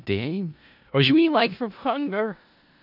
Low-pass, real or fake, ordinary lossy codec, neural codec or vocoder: 5.4 kHz; fake; AAC, 48 kbps; codec, 16 kHz in and 24 kHz out, 0.4 kbps, LongCat-Audio-Codec, four codebook decoder